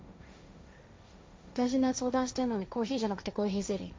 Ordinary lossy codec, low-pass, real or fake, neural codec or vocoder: none; none; fake; codec, 16 kHz, 1.1 kbps, Voila-Tokenizer